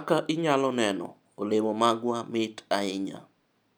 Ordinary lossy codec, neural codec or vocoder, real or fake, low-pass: none; none; real; none